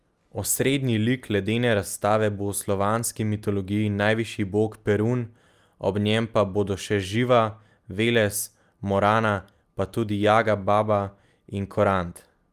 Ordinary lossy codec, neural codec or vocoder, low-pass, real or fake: Opus, 32 kbps; none; 14.4 kHz; real